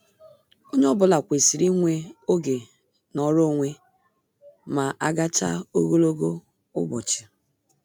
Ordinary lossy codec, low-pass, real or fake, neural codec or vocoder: none; 19.8 kHz; real; none